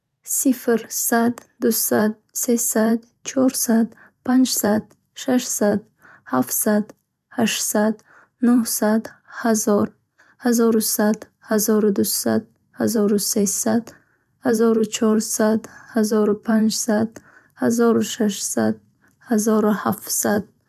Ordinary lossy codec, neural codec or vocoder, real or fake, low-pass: none; vocoder, 44.1 kHz, 128 mel bands every 512 samples, BigVGAN v2; fake; 14.4 kHz